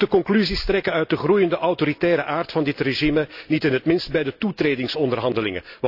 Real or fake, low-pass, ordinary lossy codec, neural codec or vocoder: real; 5.4 kHz; AAC, 48 kbps; none